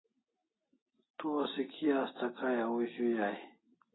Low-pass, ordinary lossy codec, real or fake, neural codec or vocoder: 7.2 kHz; AAC, 16 kbps; real; none